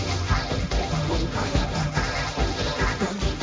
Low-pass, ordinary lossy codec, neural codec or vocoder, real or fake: none; none; codec, 16 kHz, 1.1 kbps, Voila-Tokenizer; fake